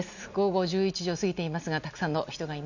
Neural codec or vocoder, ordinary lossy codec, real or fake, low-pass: none; none; real; 7.2 kHz